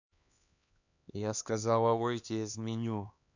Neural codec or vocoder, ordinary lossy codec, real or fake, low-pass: codec, 16 kHz, 2 kbps, X-Codec, HuBERT features, trained on LibriSpeech; none; fake; 7.2 kHz